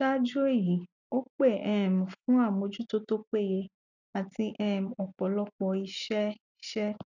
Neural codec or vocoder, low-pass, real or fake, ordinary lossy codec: none; 7.2 kHz; real; none